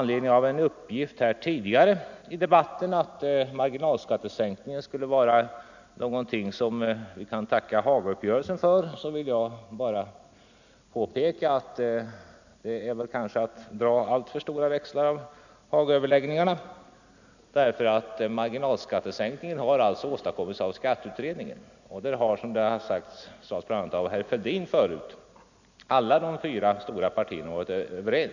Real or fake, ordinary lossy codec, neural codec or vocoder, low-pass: real; none; none; 7.2 kHz